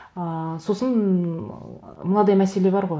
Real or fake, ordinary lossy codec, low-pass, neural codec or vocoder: real; none; none; none